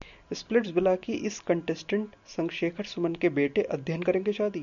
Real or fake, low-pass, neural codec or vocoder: real; 7.2 kHz; none